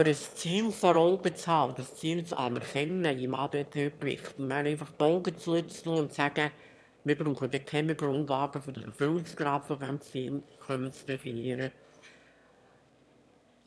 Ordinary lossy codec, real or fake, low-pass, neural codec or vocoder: none; fake; none; autoencoder, 22.05 kHz, a latent of 192 numbers a frame, VITS, trained on one speaker